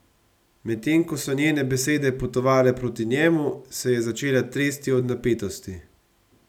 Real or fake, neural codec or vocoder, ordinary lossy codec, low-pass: real; none; none; 19.8 kHz